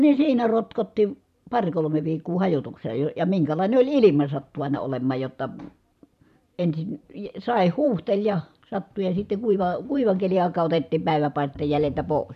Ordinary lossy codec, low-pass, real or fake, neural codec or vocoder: none; 14.4 kHz; fake; vocoder, 44.1 kHz, 128 mel bands every 512 samples, BigVGAN v2